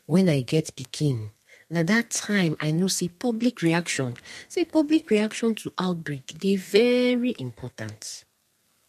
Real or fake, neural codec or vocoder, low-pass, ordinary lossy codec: fake; codec, 32 kHz, 1.9 kbps, SNAC; 14.4 kHz; MP3, 64 kbps